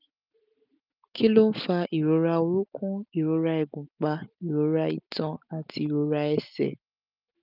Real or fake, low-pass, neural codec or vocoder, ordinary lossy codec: real; 5.4 kHz; none; none